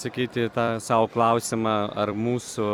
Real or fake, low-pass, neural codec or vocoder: fake; 19.8 kHz; vocoder, 44.1 kHz, 128 mel bands every 256 samples, BigVGAN v2